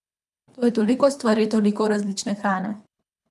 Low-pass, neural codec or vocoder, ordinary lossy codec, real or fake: none; codec, 24 kHz, 3 kbps, HILCodec; none; fake